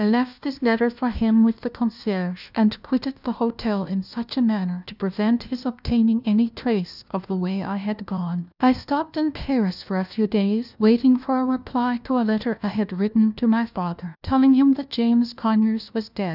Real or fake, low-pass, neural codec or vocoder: fake; 5.4 kHz; codec, 16 kHz, 1 kbps, FunCodec, trained on LibriTTS, 50 frames a second